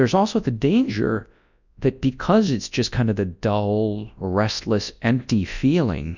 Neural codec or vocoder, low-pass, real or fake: codec, 24 kHz, 0.9 kbps, WavTokenizer, large speech release; 7.2 kHz; fake